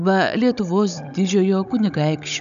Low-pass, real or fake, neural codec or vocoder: 7.2 kHz; fake; codec, 16 kHz, 16 kbps, FunCodec, trained on Chinese and English, 50 frames a second